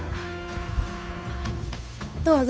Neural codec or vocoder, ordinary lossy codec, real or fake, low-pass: codec, 16 kHz, 8 kbps, FunCodec, trained on Chinese and English, 25 frames a second; none; fake; none